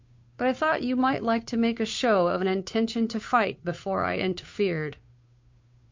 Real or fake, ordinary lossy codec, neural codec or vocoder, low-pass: fake; MP3, 48 kbps; codec, 16 kHz, 2 kbps, FunCodec, trained on Chinese and English, 25 frames a second; 7.2 kHz